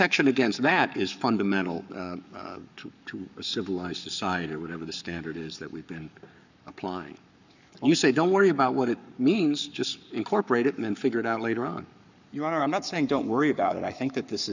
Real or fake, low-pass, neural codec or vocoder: fake; 7.2 kHz; codec, 44.1 kHz, 7.8 kbps, Pupu-Codec